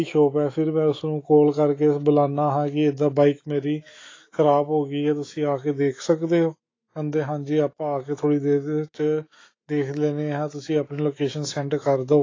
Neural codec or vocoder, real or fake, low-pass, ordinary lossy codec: autoencoder, 48 kHz, 128 numbers a frame, DAC-VAE, trained on Japanese speech; fake; 7.2 kHz; AAC, 32 kbps